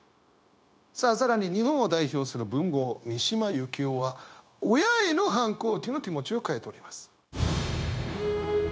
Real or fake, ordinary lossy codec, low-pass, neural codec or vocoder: fake; none; none; codec, 16 kHz, 0.9 kbps, LongCat-Audio-Codec